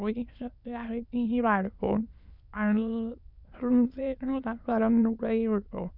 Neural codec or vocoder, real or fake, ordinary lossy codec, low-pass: autoencoder, 22.05 kHz, a latent of 192 numbers a frame, VITS, trained on many speakers; fake; none; 5.4 kHz